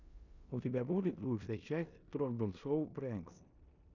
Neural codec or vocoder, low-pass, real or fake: codec, 16 kHz in and 24 kHz out, 0.9 kbps, LongCat-Audio-Codec, four codebook decoder; 7.2 kHz; fake